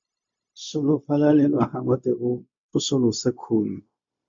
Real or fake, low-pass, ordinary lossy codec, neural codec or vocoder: fake; 7.2 kHz; MP3, 48 kbps; codec, 16 kHz, 0.4 kbps, LongCat-Audio-Codec